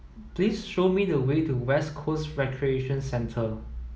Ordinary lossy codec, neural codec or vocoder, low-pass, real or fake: none; none; none; real